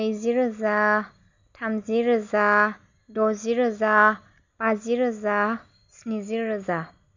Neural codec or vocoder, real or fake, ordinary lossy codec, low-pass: none; real; none; 7.2 kHz